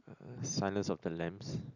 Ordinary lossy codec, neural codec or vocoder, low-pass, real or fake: none; none; 7.2 kHz; real